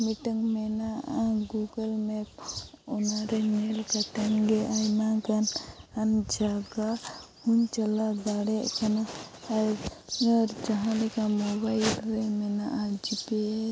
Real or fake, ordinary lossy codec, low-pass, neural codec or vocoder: real; none; none; none